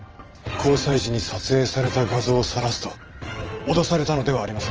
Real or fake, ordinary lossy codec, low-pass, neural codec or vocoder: fake; Opus, 24 kbps; 7.2 kHz; vocoder, 22.05 kHz, 80 mel bands, WaveNeXt